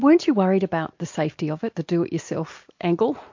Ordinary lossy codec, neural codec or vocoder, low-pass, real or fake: MP3, 48 kbps; none; 7.2 kHz; real